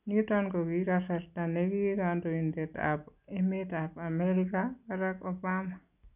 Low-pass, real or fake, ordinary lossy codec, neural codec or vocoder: 3.6 kHz; real; none; none